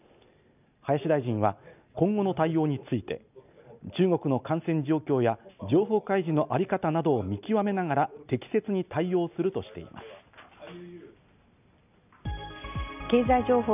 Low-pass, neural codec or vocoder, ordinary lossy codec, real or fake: 3.6 kHz; none; none; real